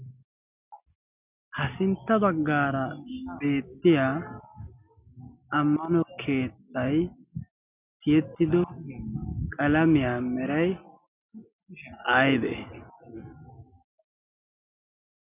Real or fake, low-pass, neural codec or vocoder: real; 3.6 kHz; none